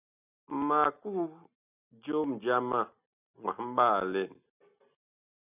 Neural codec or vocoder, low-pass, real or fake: none; 3.6 kHz; real